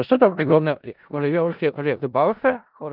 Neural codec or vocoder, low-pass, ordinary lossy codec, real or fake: codec, 16 kHz in and 24 kHz out, 0.4 kbps, LongCat-Audio-Codec, four codebook decoder; 5.4 kHz; Opus, 24 kbps; fake